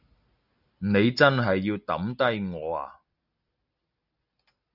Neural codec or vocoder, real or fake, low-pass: none; real; 5.4 kHz